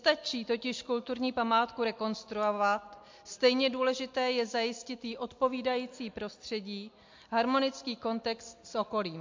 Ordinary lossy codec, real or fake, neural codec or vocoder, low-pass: MP3, 48 kbps; real; none; 7.2 kHz